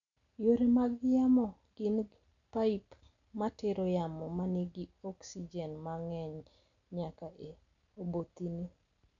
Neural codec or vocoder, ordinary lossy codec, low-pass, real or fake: none; none; 7.2 kHz; real